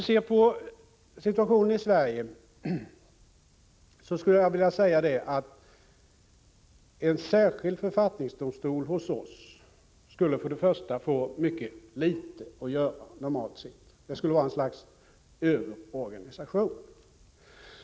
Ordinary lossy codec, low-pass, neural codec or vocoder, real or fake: none; none; none; real